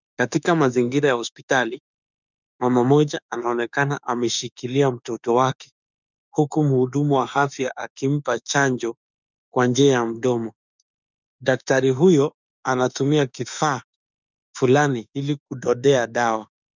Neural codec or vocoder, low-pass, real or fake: autoencoder, 48 kHz, 32 numbers a frame, DAC-VAE, trained on Japanese speech; 7.2 kHz; fake